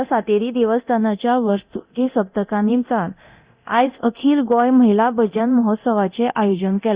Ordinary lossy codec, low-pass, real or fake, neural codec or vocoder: Opus, 64 kbps; 3.6 kHz; fake; codec, 24 kHz, 0.9 kbps, DualCodec